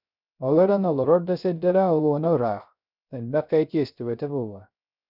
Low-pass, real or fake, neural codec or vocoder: 5.4 kHz; fake; codec, 16 kHz, 0.3 kbps, FocalCodec